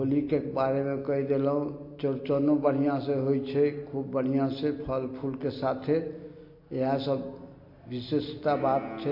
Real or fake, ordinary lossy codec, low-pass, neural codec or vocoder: real; MP3, 32 kbps; 5.4 kHz; none